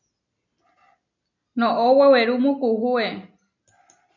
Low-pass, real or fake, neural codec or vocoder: 7.2 kHz; real; none